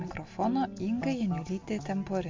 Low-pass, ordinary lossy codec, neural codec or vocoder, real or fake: 7.2 kHz; MP3, 48 kbps; none; real